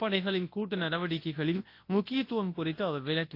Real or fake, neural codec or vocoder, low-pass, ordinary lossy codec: fake; codec, 24 kHz, 0.9 kbps, WavTokenizer, large speech release; 5.4 kHz; AAC, 32 kbps